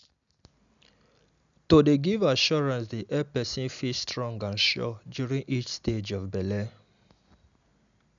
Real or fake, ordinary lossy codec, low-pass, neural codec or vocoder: real; none; 7.2 kHz; none